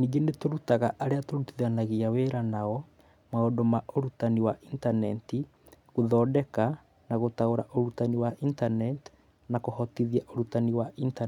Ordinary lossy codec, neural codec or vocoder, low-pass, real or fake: none; none; 19.8 kHz; real